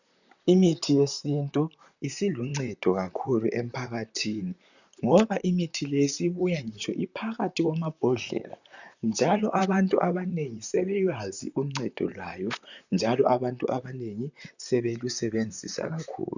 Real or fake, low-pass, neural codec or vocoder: fake; 7.2 kHz; vocoder, 44.1 kHz, 128 mel bands, Pupu-Vocoder